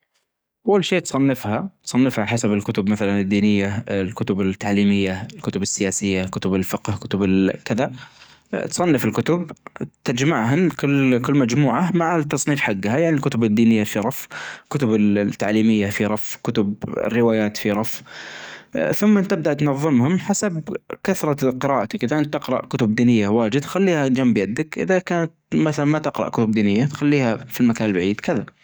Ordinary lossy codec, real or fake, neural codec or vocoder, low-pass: none; fake; codec, 44.1 kHz, 7.8 kbps, DAC; none